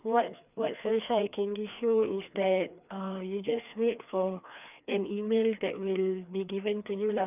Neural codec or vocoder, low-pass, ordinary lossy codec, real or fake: codec, 16 kHz, 2 kbps, FreqCodec, larger model; 3.6 kHz; none; fake